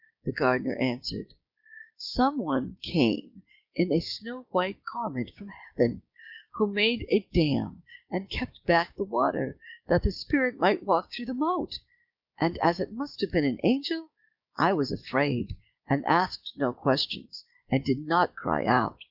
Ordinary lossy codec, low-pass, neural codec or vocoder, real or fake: Opus, 64 kbps; 5.4 kHz; codec, 16 kHz, 6 kbps, DAC; fake